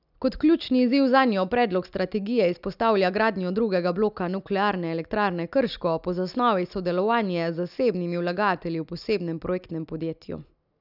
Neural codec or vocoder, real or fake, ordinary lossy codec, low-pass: none; real; none; 5.4 kHz